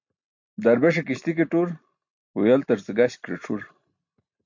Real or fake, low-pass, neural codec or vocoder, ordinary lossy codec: real; 7.2 kHz; none; MP3, 48 kbps